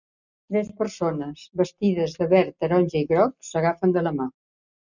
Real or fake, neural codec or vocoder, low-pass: real; none; 7.2 kHz